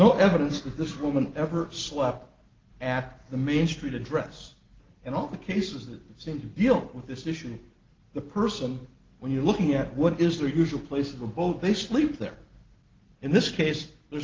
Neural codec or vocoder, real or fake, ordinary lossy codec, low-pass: none; real; Opus, 16 kbps; 7.2 kHz